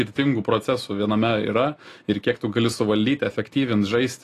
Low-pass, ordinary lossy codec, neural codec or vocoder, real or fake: 14.4 kHz; AAC, 48 kbps; none; real